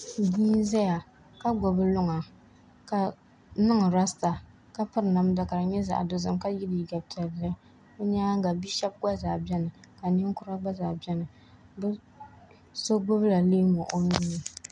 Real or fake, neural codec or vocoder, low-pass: real; none; 9.9 kHz